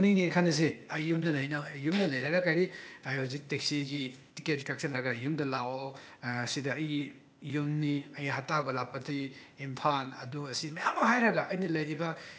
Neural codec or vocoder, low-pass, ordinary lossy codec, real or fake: codec, 16 kHz, 0.8 kbps, ZipCodec; none; none; fake